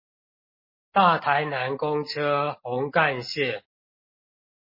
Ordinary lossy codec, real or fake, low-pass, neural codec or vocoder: MP3, 24 kbps; real; 5.4 kHz; none